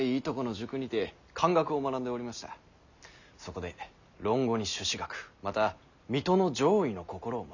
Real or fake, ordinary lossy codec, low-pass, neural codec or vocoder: real; none; 7.2 kHz; none